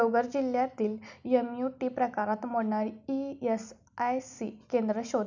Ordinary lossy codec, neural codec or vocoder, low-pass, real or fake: none; none; 7.2 kHz; real